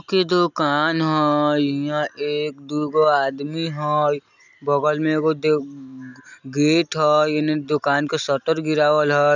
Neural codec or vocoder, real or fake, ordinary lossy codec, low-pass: none; real; none; 7.2 kHz